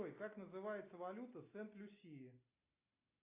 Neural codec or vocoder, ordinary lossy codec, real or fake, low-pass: none; AAC, 24 kbps; real; 3.6 kHz